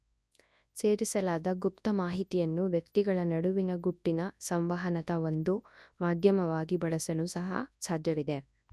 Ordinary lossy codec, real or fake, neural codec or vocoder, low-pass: none; fake; codec, 24 kHz, 0.9 kbps, WavTokenizer, large speech release; none